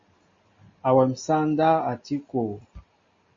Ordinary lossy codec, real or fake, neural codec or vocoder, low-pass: MP3, 32 kbps; real; none; 7.2 kHz